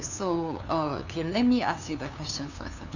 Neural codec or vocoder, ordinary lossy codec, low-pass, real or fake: codec, 16 kHz, 2 kbps, FunCodec, trained on LibriTTS, 25 frames a second; none; 7.2 kHz; fake